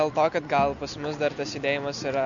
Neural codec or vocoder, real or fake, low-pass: none; real; 7.2 kHz